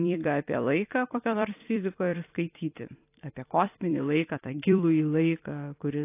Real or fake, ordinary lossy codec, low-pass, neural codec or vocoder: fake; AAC, 24 kbps; 3.6 kHz; vocoder, 44.1 kHz, 128 mel bands every 256 samples, BigVGAN v2